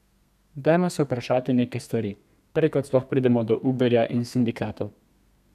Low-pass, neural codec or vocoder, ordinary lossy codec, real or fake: 14.4 kHz; codec, 32 kHz, 1.9 kbps, SNAC; none; fake